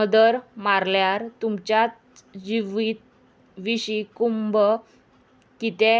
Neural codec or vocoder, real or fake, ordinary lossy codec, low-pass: none; real; none; none